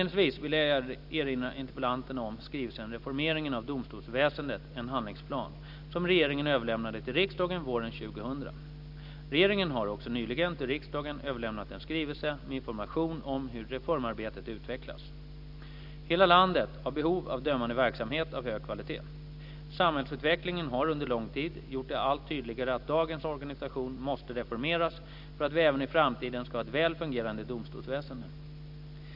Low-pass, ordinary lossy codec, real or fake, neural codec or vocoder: 5.4 kHz; none; real; none